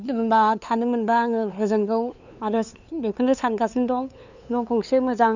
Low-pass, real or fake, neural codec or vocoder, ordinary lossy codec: 7.2 kHz; fake; codec, 16 kHz, 2 kbps, FunCodec, trained on LibriTTS, 25 frames a second; none